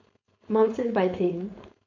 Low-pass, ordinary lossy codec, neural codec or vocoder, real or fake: 7.2 kHz; none; codec, 16 kHz, 4.8 kbps, FACodec; fake